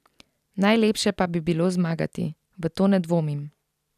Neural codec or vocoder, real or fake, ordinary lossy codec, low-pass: none; real; none; 14.4 kHz